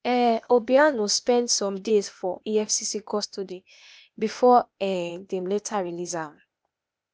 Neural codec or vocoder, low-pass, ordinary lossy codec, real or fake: codec, 16 kHz, 0.8 kbps, ZipCodec; none; none; fake